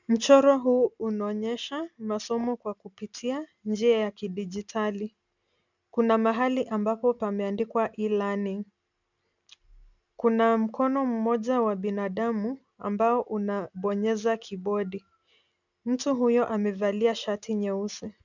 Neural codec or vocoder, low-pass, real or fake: none; 7.2 kHz; real